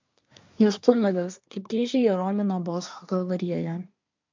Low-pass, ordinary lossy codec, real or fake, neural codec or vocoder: 7.2 kHz; MP3, 64 kbps; fake; codec, 24 kHz, 1 kbps, SNAC